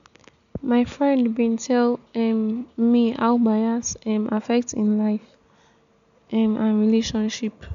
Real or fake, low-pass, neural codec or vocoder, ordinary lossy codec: real; 7.2 kHz; none; none